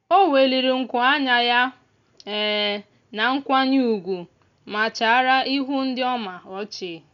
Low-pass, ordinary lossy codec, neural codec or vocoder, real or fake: 7.2 kHz; none; none; real